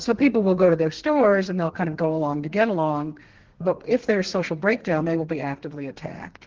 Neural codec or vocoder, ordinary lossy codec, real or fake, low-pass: codec, 32 kHz, 1.9 kbps, SNAC; Opus, 16 kbps; fake; 7.2 kHz